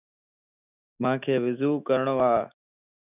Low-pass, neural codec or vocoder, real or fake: 3.6 kHz; autoencoder, 48 kHz, 128 numbers a frame, DAC-VAE, trained on Japanese speech; fake